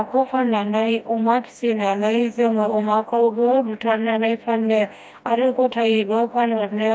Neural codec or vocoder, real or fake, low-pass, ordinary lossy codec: codec, 16 kHz, 1 kbps, FreqCodec, smaller model; fake; none; none